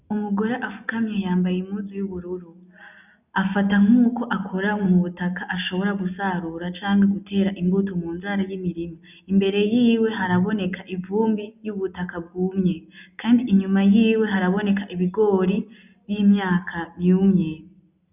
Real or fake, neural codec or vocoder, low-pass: real; none; 3.6 kHz